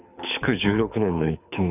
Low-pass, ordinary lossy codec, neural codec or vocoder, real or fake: 3.6 kHz; none; codec, 24 kHz, 6 kbps, HILCodec; fake